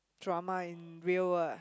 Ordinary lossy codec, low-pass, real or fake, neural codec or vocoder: none; none; real; none